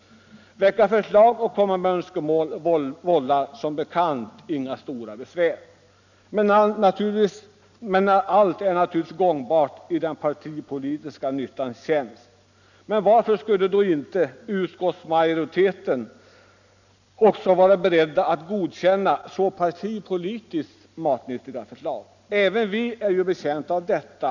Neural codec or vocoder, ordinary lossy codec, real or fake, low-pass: none; none; real; 7.2 kHz